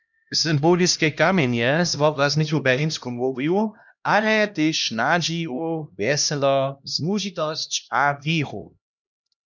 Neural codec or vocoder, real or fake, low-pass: codec, 16 kHz, 1 kbps, X-Codec, HuBERT features, trained on LibriSpeech; fake; 7.2 kHz